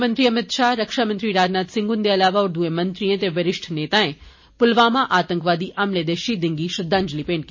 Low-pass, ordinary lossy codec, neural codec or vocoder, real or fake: 7.2 kHz; MP3, 32 kbps; none; real